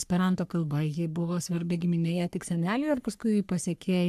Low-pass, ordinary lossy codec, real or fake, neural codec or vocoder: 14.4 kHz; AAC, 96 kbps; fake; codec, 44.1 kHz, 3.4 kbps, Pupu-Codec